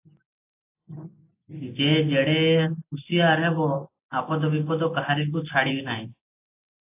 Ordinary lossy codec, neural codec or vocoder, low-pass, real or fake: AAC, 32 kbps; none; 3.6 kHz; real